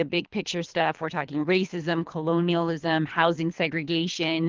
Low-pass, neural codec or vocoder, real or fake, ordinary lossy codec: 7.2 kHz; codec, 24 kHz, 3 kbps, HILCodec; fake; Opus, 32 kbps